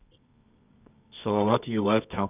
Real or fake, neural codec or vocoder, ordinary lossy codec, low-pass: fake; codec, 24 kHz, 0.9 kbps, WavTokenizer, medium music audio release; none; 3.6 kHz